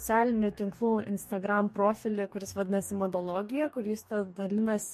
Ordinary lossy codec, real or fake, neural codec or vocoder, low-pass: MP3, 64 kbps; fake; codec, 44.1 kHz, 2.6 kbps, DAC; 14.4 kHz